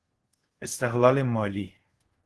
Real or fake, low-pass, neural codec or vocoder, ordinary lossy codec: fake; 10.8 kHz; codec, 24 kHz, 0.5 kbps, DualCodec; Opus, 16 kbps